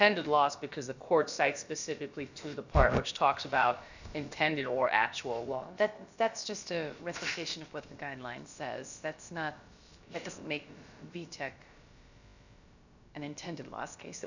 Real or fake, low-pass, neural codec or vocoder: fake; 7.2 kHz; codec, 16 kHz, about 1 kbps, DyCAST, with the encoder's durations